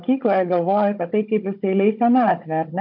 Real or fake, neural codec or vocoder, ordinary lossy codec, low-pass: fake; codec, 16 kHz, 16 kbps, FunCodec, trained on Chinese and English, 50 frames a second; MP3, 48 kbps; 5.4 kHz